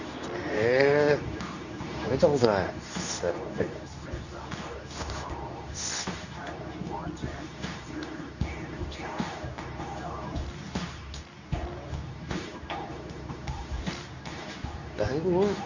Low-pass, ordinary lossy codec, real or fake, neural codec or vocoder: 7.2 kHz; none; fake; codec, 24 kHz, 0.9 kbps, WavTokenizer, medium speech release version 2